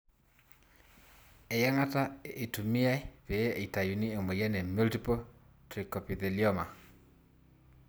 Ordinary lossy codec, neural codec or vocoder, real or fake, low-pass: none; none; real; none